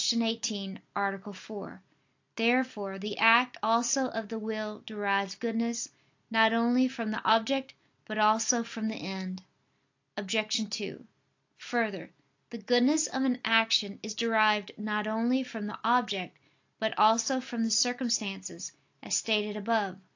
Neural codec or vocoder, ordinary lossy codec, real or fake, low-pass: none; AAC, 48 kbps; real; 7.2 kHz